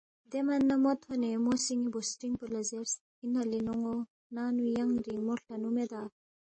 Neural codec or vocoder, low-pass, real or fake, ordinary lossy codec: none; 9.9 kHz; real; MP3, 32 kbps